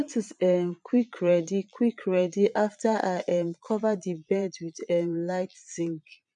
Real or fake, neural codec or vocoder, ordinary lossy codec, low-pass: fake; vocoder, 22.05 kHz, 80 mel bands, Vocos; none; 9.9 kHz